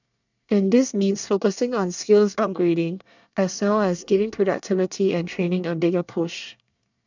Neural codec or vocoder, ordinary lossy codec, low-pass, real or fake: codec, 24 kHz, 1 kbps, SNAC; none; 7.2 kHz; fake